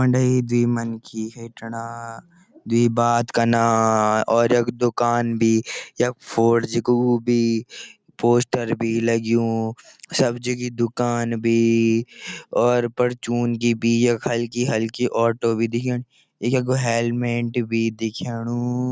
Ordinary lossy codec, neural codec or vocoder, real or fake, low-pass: none; none; real; none